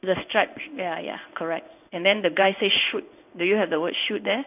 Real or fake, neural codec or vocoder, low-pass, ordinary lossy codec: fake; codec, 16 kHz in and 24 kHz out, 1 kbps, XY-Tokenizer; 3.6 kHz; none